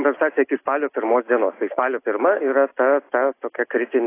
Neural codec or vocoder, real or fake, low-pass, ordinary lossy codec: none; real; 3.6 kHz; AAC, 24 kbps